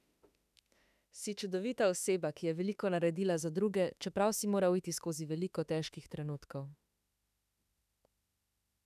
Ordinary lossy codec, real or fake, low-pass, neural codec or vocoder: none; fake; 14.4 kHz; autoencoder, 48 kHz, 32 numbers a frame, DAC-VAE, trained on Japanese speech